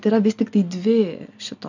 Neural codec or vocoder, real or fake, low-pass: none; real; 7.2 kHz